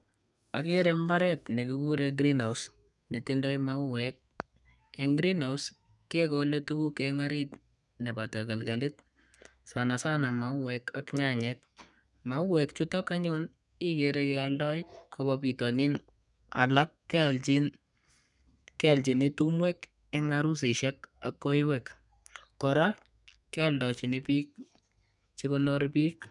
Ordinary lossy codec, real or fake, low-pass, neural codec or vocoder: none; fake; 10.8 kHz; codec, 32 kHz, 1.9 kbps, SNAC